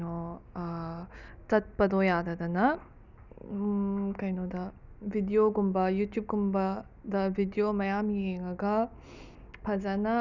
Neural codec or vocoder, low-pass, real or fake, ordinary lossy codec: none; 7.2 kHz; real; none